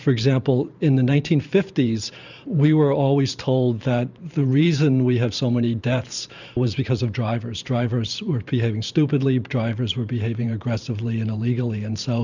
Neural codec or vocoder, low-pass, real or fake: vocoder, 44.1 kHz, 128 mel bands every 512 samples, BigVGAN v2; 7.2 kHz; fake